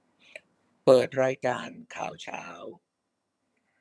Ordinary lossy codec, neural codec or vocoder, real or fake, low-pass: none; vocoder, 22.05 kHz, 80 mel bands, HiFi-GAN; fake; none